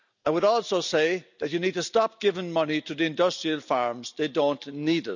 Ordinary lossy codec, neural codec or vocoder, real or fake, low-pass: none; none; real; 7.2 kHz